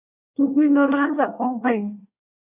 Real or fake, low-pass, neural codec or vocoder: fake; 3.6 kHz; codec, 24 kHz, 1 kbps, SNAC